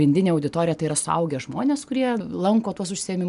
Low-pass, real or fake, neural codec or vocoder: 10.8 kHz; real; none